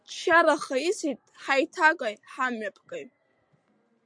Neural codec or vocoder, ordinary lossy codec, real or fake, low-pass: none; MP3, 64 kbps; real; 9.9 kHz